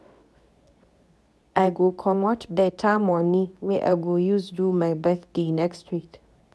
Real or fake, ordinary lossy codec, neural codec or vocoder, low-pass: fake; none; codec, 24 kHz, 0.9 kbps, WavTokenizer, medium speech release version 2; none